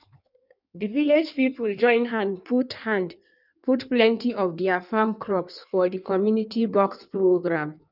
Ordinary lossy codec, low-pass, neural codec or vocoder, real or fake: none; 5.4 kHz; codec, 16 kHz in and 24 kHz out, 1.1 kbps, FireRedTTS-2 codec; fake